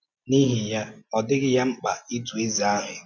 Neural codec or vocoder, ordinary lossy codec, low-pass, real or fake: none; none; none; real